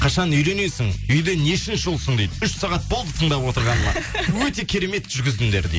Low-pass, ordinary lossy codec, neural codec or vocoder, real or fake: none; none; none; real